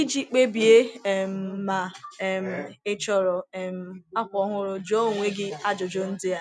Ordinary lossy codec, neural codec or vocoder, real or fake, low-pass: none; none; real; none